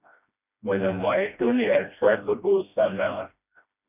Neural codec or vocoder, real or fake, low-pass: codec, 16 kHz, 1 kbps, FreqCodec, smaller model; fake; 3.6 kHz